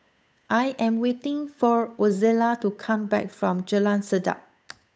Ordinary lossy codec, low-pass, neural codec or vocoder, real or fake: none; none; codec, 16 kHz, 8 kbps, FunCodec, trained on Chinese and English, 25 frames a second; fake